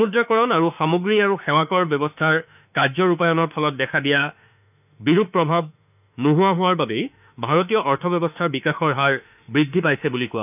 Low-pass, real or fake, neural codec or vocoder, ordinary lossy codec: 3.6 kHz; fake; autoencoder, 48 kHz, 32 numbers a frame, DAC-VAE, trained on Japanese speech; none